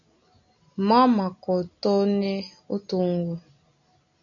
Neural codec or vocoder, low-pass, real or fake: none; 7.2 kHz; real